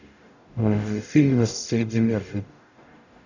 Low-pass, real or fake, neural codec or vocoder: 7.2 kHz; fake; codec, 44.1 kHz, 0.9 kbps, DAC